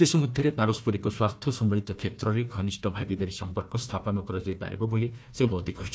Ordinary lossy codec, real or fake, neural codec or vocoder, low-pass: none; fake; codec, 16 kHz, 1 kbps, FunCodec, trained on Chinese and English, 50 frames a second; none